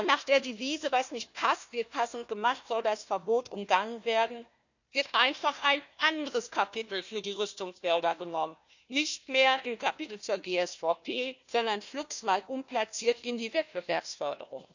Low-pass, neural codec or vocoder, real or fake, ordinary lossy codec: 7.2 kHz; codec, 16 kHz, 1 kbps, FunCodec, trained on Chinese and English, 50 frames a second; fake; none